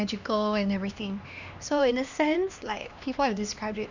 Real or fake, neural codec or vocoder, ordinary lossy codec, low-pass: fake; codec, 16 kHz, 2 kbps, X-Codec, HuBERT features, trained on LibriSpeech; none; 7.2 kHz